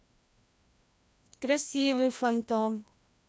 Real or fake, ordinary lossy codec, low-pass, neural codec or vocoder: fake; none; none; codec, 16 kHz, 1 kbps, FreqCodec, larger model